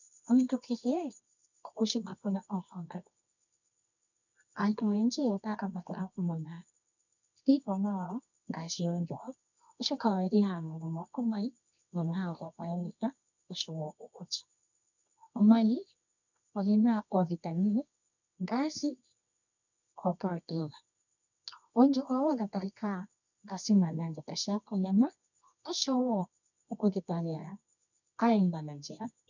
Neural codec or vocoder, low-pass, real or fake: codec, 24 kHz, 0.9 kbps, WavTokenizer, medium music audio release; 7.2 kHz; fake